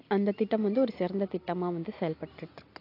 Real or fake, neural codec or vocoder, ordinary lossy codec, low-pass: real; none; AAC, 32 kbps; 5.4 kHz